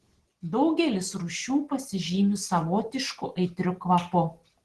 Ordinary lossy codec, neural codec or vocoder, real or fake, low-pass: Opus, 16 kbps; none; real; 10.8 kHz